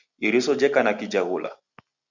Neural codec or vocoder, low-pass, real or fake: none; 7.2 kHz; real